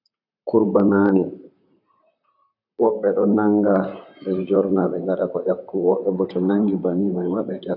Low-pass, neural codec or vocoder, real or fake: 5.4 kHz; vocoder, 44.1 kHz, 128 mel bands, Pupu-Vocoder; fake